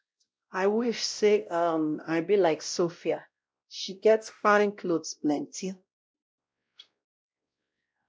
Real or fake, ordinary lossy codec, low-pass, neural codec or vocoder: fake; none; none; codec, 16 kHz, 0.5 kbps, X-Codec, WavLM features, trained on Multilingual LibriSpeech